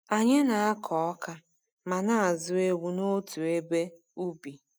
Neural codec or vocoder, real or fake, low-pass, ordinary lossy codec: none; real; none; none